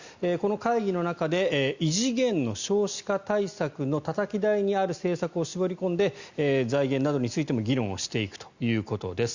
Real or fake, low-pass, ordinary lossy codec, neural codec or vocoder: real; 7.2 kHz; Opus, 64 kbps; none